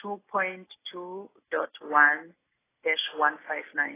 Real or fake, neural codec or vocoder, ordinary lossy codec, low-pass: real; none; AAC, 16 kbps; 3.6 kHz